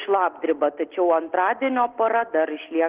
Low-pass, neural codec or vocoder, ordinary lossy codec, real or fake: 3.6 kHz; none; Opus, 16 kbps; real